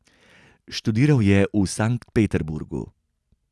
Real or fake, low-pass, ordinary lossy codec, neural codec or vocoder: real; none; none; none